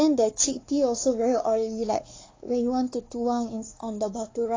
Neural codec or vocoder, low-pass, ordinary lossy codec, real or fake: codec, 16 kHz, 4 kbps, X-Codec, WavLM features, trained on Multilingual LibriSpeech; 7.2 kHz; AAC, 32 kbps; fake